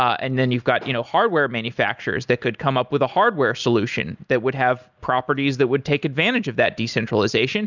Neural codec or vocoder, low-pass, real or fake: none; 7.2 kHz; real